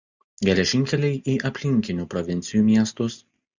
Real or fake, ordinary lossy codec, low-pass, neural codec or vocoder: real; Opus, 64 kbps; 7.2 kHz; none